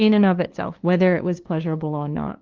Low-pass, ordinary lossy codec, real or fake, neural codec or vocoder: 7.2 kHz; Opus, 16 kbps; fake; codec, 16 kHz, 2 kbps, FunCodec, trained on LibriTTS, 25 frames a second